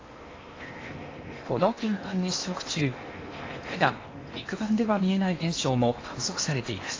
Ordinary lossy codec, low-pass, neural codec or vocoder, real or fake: AAC, 32 kbps; 7.2 kHz; codec, 16 kHz in and 24 kHz out, 0.8 kbps, FocalCodec, streaming, 65536 codes; fake